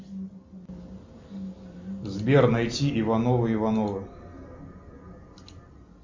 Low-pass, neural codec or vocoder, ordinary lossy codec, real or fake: 7.2 kHz; none; MP3, 64 kbps; real